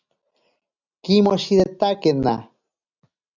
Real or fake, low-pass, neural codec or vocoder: real; 7.2 kHz; none